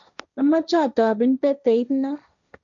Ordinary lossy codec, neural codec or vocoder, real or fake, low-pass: none; codec, 16 kHz, 1.1 kbps, Voila-Tokenizer; fake; 7.2 kHz